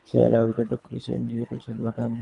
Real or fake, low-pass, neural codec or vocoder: fake; 10.8 kHz; codec, 24 kHz, 3 kbps, HILCodec